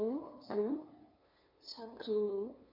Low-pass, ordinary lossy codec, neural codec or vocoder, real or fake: 5.4 kHz; MP3, 32 kbps; codec, 16 kHz, 2 kbps, FunCodec, trained on LibriTTS, 25 frames a second; fake